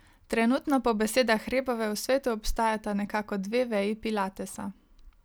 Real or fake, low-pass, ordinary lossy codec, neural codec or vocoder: real; none; none; none